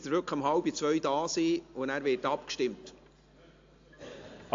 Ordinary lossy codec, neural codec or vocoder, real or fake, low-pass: AAC, 48 kbps; none; real; 7.2 kHz